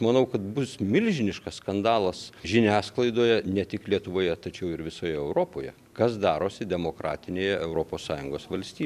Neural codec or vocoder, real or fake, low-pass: none; real; 14.4 kHz